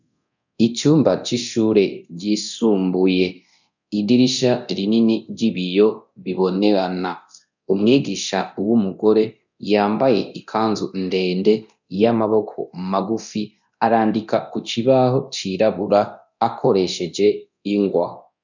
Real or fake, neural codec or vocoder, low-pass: fake; codec, 24 kHz, 0.9 kbps, DualCodec; 7.2 kHz